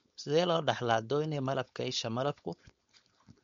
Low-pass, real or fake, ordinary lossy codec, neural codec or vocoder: 7.2 kHz; fake; MP3, 48 kbps; codec, 16 kHz, 4.8 kbps, FACodec